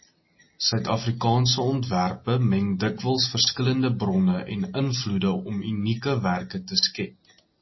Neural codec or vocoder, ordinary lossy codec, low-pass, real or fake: none; MP3, 24 kbps; 7.2 kHz; real